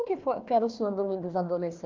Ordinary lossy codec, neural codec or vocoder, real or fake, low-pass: Opus, 32 kbps; codec, 16 kHz, 1 kbps, FunCodec, trained on Chinese and English, 50 frames a second; fake; 7.2 kHz